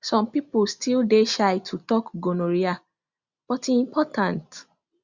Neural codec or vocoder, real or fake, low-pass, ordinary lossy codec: none; real; 7.2 kHz; Opus, 64 kbps